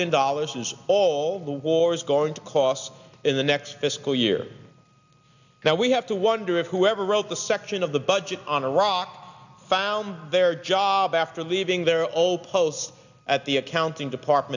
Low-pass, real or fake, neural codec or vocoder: 7.2 kHz; real; none